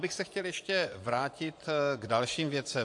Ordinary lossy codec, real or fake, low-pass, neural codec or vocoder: AAC, 64 kbps; fake; 10.8 kHz; vocoder, 24 kHz, 100 mel bands, Vocos